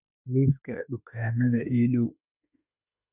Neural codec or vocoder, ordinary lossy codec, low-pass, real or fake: autoencoder, 48 kHz, 32 numbers a frame, DAC-VAE, trained on Japanese speech; none; 3.6 kHz; fake